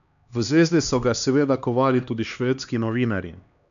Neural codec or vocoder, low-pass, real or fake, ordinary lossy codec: codec, 16 kHz, 2 kbps, X-Codec, HuBERT features, trained on LibriSpeech; 7.2 kHz; fake; none